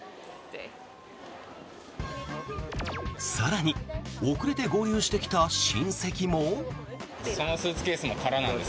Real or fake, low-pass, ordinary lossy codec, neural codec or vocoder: real; none; none; none